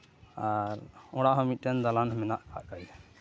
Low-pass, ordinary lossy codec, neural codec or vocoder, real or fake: none; none; none; real